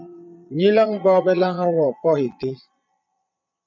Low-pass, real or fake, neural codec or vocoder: 7.2 kHz; fake; vocoder, 22.05 kHz, 80 mel bands, Vocos